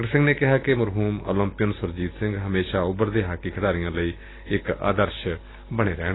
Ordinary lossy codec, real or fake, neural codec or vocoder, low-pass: AAC, 16 kbps; real; none; 7.2 kHz